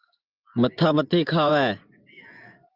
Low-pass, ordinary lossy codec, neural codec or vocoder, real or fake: 5.4 kHz; Opus, 24 kbps; vocoder, 24 kHz, 100 mel bands, Vocos; fake